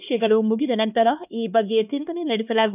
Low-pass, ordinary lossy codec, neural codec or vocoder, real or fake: 3.6 kHz; none; codec, 16 kHz, 2 kbps, X-Codec, HuBERT features, trained on LibriSpeech; fake